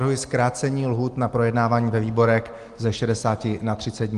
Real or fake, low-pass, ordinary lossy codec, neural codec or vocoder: real; 10.8 kHz; Opus, 24 kbps; none